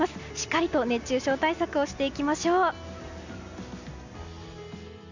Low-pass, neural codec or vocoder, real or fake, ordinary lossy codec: 7.2 kHz; none; real; none